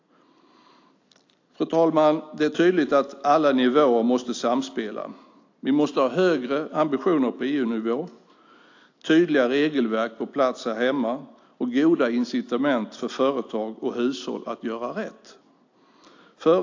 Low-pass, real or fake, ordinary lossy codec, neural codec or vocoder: 7.2 kHz; real; AAC, 48 kbps; none